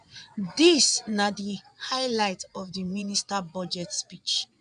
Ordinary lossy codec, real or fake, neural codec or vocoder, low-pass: none; fake; vocoder, 22.05 kHz, 80 mel bands, Vocos; 9.9 kHz